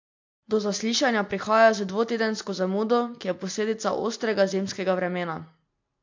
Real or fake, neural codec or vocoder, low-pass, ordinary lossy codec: real; none; 7.2 kHz; MP3, 48 kbps